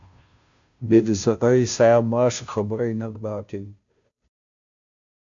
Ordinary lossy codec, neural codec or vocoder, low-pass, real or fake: AAC, 64 kbps; codec, 16 kHz, 0.5 kbps, FunCodec, trained on Chinese and English, 25 frames a second; 7.2 kHz; fake